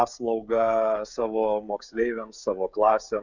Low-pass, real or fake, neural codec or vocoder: 7.2 kHz; fake; codec, 16 kHz, 16 kbps, FreqCodec, smaller model